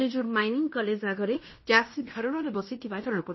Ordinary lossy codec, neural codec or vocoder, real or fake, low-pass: MP3, 24 kbps; codec, 16 kHz in and 24 kHz out, 0.9 kbps, LongCat-Audio-Codec, fine tuned four codebook decoder; fake; 7.2 kHz